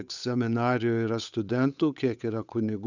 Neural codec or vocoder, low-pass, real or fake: codec, 16 kHz, 8 kbps, FunCodec, trained on Chinese and English, 25 frames a second; 7.2 kHz; fake